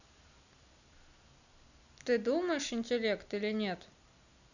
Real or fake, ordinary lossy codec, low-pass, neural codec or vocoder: real; none; 7.2 kHz; none